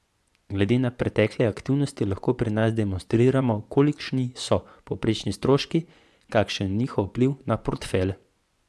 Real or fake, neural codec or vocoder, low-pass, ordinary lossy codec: fake; vocoder, 24 kHz, 100 mel bands, Vocos; none; none